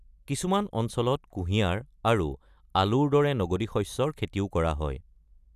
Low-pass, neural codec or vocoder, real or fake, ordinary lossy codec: 14.4 kHz; none; real; none